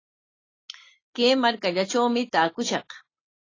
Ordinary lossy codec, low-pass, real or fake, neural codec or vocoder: AAC, 32 kbps; 7.2 kHz; real; none